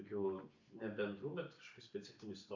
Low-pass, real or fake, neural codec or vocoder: 7.2 kHz; real; none